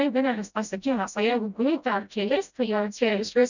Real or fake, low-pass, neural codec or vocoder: fake; 7.2 kHz; codec, 16 kHz, 0.5 kbps, FreqCodec, smaller model